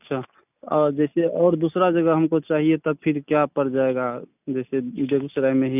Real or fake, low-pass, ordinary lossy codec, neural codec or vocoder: real; 3.6 kHz; none; none